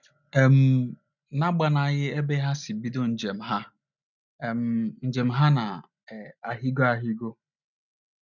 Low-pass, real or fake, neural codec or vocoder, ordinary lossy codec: 7.2 kHz; real; none; none